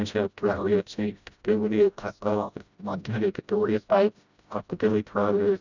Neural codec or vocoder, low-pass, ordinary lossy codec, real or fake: codec, 16 kHz, 0.5 kbps, FreqCodec, smaller model; 7.2 kHz; none; fake